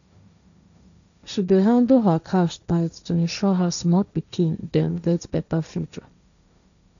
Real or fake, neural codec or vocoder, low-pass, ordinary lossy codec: fake; codec, 16 kHz, 1.1 kbps, Voila-Tokenizer; 7.2 kHz; none